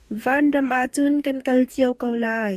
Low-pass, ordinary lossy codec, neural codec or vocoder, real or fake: 14.4 kHz; AAC, 96 kbps; codec, 44.1 kHz, 2.6 kbps, DAC; fake